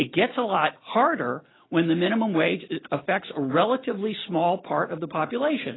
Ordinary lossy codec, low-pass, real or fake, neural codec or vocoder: AAC, 16 kbps; 7.2 kHz; real; none